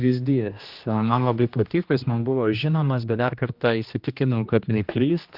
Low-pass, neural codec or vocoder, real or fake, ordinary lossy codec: 5.4 kHz; codec, 16 kHz, 1 kbps, X-Codec, HuBERT features, trained on general audio; fake; Opus, 32 kbps